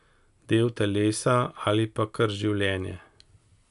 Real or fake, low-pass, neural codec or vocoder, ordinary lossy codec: real; 10.8 kHz; none; none